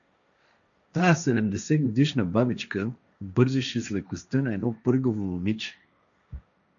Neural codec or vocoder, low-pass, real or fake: codec, 16 kHz, 1.1 kbps, Voila-Tokenizer; 7.2 kHz; fake